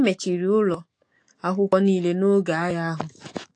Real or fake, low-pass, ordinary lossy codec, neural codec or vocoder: fake; 9.9 kHz; AAC, 48 kbps; autoencoder, 48 kHz, 128 numbers a frame, DAC-VAE, trained on Japanese speech